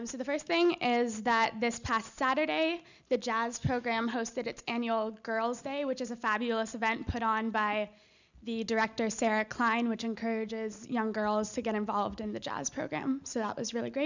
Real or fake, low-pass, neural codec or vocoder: real; 7.2 kHz; none